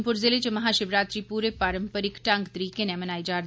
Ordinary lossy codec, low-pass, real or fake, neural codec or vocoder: none; none; real; none